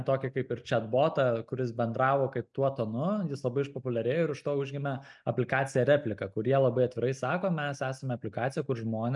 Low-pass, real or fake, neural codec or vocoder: 10.8 kHz; real; none